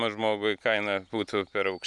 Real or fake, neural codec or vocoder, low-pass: real; none; 10.8 kHz